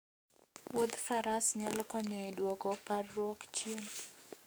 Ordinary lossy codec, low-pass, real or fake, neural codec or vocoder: none; none; fake; codec, 44.1 kHz, 7.8 kbps, DAC